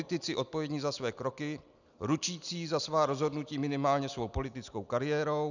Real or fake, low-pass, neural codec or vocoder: real; 7.2 kHz; none